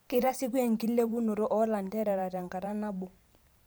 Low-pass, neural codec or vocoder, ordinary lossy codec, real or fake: none; vocoder, 44.1 kHz, 128 mel bands every 512 samples, BigVGAN v2; none; fake